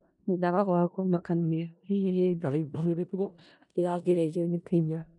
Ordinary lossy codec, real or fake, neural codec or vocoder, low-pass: none; fake; codec, 16 kHz in and 24 kHz out, 0.4 kbps, LongCat-Audio-Codec, four codebook decoder; 10.8 kHz